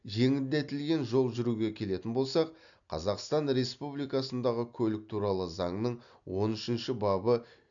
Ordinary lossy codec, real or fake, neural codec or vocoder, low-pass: none; real; none; 7.2 kHz